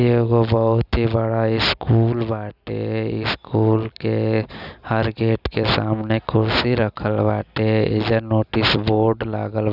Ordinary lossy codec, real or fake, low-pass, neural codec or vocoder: none; real; 5.4 kHz; none